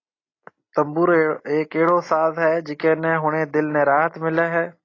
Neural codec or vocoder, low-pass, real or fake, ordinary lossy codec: none; 7.2 kHz; real; AAC, 32 kbps